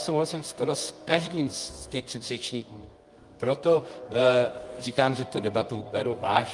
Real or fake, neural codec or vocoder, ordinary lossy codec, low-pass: fake; codec, 24 kHz, 0.9 kbps, WavTokenizer, medium music audio release; Opus, 32 kbps; 10.8 kHz